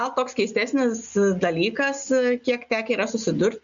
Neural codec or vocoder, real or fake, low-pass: none; real; 7.2 kHz